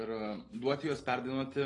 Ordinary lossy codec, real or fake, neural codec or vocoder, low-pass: AAC, 32 kbps; real; none; 10.8 kHz